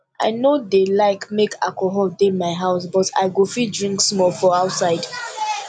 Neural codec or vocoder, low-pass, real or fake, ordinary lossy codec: none; 9.9 kHz; real; none